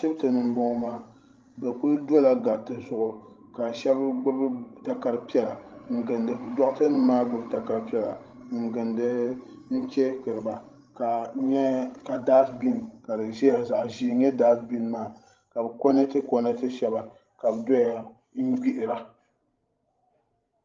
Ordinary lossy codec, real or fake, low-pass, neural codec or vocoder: Opus, 32 kbps; fake; 7.2 kHz; codec, 16 kHz, 16 kbps, FreqCodec, larger model